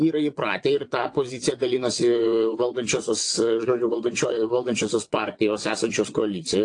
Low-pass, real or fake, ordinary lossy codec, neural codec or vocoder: 9.9 kHz; fake; AAC, 48 kbps; vocoder, 22.05 kHz, 80 mel bands, WaveNeXt